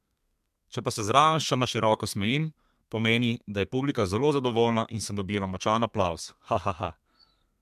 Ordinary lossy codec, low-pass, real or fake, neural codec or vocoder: MP3, 96 kbps; 14.4 kHz; fake; codec, 32 kHz, 1.9 kbps, SNAC